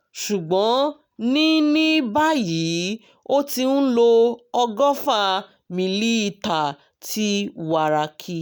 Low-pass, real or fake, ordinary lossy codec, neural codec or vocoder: none; real; none; none